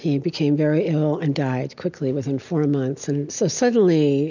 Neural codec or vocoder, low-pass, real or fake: none; 7.2 kHz; real